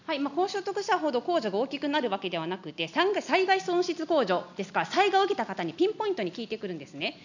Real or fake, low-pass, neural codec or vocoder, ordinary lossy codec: real; 7.2 kHz; none; none